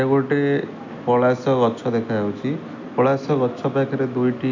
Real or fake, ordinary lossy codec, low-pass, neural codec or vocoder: real; AAC, 48 kbps; 7.2 kHz; none